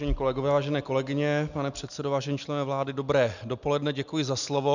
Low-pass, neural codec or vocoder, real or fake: 7.2 kHz; none; real